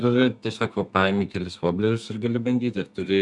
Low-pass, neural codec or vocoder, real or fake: 10.8 kHz; codec, 32 kHz, 1.9 kbps, SNAC; fake